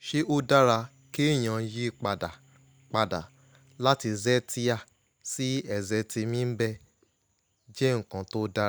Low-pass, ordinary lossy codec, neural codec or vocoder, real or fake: none; none; none; real